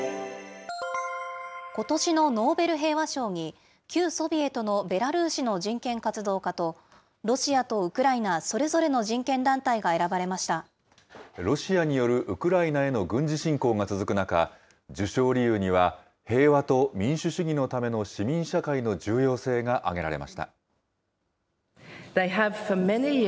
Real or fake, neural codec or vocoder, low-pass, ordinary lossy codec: real; none; none; none